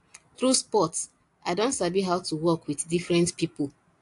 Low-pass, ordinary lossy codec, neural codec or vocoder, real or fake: 10.8 kHz; AAC, 48 kbps; none; real